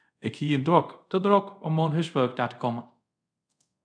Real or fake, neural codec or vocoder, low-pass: fake; codec, 24 kHz, 0.5 kbps, DualCodec; 9.9 kHz